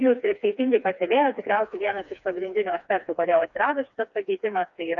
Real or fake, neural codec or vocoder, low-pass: fake; codec, 16 kHz, 2 kbps, FreqCodec, smaller model; 7.2 kHz